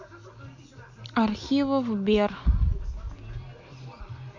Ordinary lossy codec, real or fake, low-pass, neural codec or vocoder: MP3, 48 kbps; real; 7.2 kHz; none